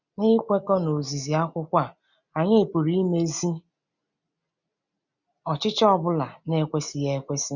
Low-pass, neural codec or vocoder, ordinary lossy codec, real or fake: 7.2 kHz; none; none; real